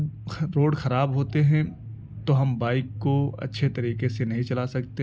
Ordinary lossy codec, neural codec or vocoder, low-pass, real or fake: none; none; none; real